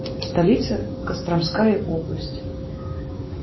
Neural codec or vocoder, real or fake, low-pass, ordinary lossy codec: codec, 16 kHz, 6 kbps, DAC; fake; 7.2 kHz; MP3, 24 kbps